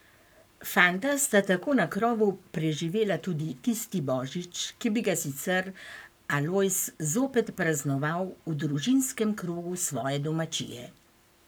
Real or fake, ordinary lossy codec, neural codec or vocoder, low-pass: fake; none; codec, 44.1 kHz, 7.8 kbps, Pupu-Codec; none